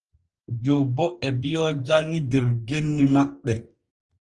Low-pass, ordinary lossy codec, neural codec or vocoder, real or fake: 10.8 kHz; Opus, 24 kbps; codec, 44.1 kHz, 2.6 kbps, DAC; fake